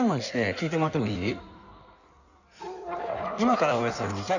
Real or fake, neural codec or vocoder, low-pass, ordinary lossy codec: fake; codec, 16 kHz in and 24 kHz out, 1.1 kbps, FireRedTTS-2 codec; 7.2 kHz; none